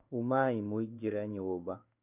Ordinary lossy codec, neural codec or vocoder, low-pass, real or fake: none; codec, 16 kHz in and 24 kHz out, 1 kbps, XY-Tokenizer; 3.6 kHz; fake